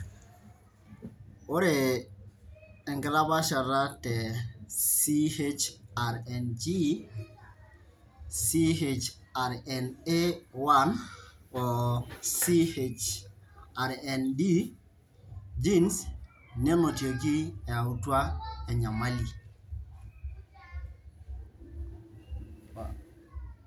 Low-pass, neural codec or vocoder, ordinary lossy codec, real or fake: none; none; none; real